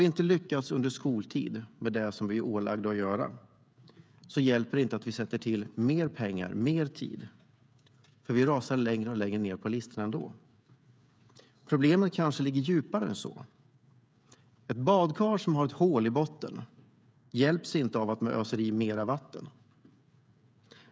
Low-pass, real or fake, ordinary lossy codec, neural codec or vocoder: none; fake; none; codec, 16 kHz, 16 kbps, FreqCodec, smaller model